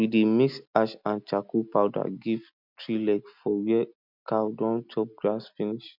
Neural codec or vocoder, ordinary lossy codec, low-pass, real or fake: none; none; 5.4 kHz; real